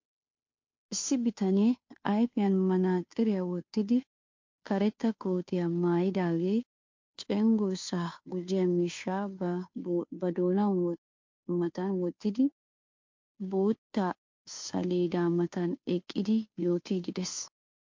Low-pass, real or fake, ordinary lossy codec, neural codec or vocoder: 7.2 kHz; fake; MP3, 48 kbps; codec, 16 kHz, 2 kbps, FunCodec, trained on Chinese and English, 25 frames a second